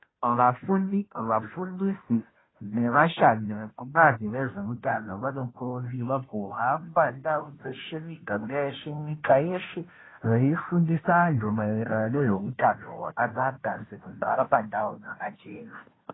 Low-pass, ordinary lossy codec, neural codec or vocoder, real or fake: 7.2 kHz; AAC, 16 kbps; codec, 16 kHz, 0.5 kbps, FunCodec, trained on Chinese and English, 25 frames a second; fake